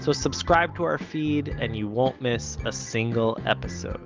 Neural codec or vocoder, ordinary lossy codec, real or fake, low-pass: none; Opus, 32 kbps; real; 7.2 kHz